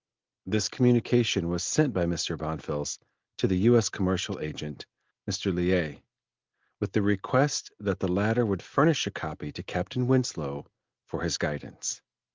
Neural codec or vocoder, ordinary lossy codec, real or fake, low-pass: none; Opus, 32 kbps; real; 7.2 kHz